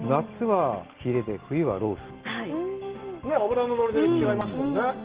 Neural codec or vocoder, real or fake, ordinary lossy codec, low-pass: none; real; Opus, 16 kbps; 3.6 kHz